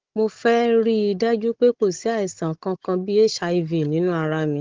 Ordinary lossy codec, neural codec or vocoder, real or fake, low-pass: Opus, 16 kbps; codec, 16 kHz, 16 kbps, FunCodec, trained on Chinese and English, 50 frames a second; fake; 7.2 kHz